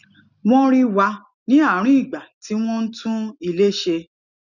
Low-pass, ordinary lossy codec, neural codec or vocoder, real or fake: 7.2 kHz; none; none; real